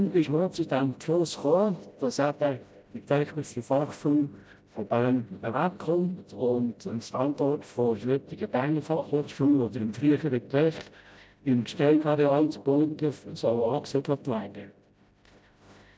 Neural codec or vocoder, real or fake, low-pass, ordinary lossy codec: codec, 16 kHz, 0.5 kbps, FreqCodec, smaller model; fake; none; none